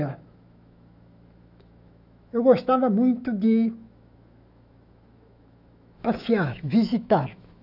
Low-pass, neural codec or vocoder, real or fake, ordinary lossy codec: 5.4 kHz; autoencoder, 48 kHz, 128 numbers a frame, DAC-VAE, trained on Japanese speech; fake; none